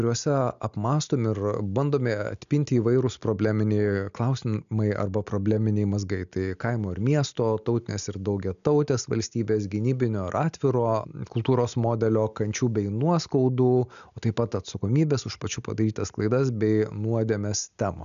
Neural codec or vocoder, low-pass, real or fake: none; 7.2 kHz; real